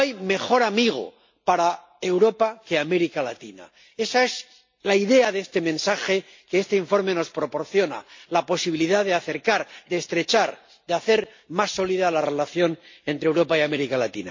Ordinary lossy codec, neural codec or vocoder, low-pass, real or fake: MP3, 48 kbps; none; 7.2 kHz; real